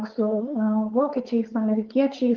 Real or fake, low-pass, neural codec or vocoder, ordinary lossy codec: fake; 7.2 kHz; codec, 16 kHz, 4.8 kbps, FACodec; Opus, 16 kbps